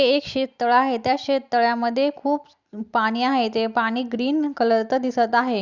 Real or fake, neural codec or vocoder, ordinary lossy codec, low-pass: real; none; Opus, 64 kbps; 7.2 kHz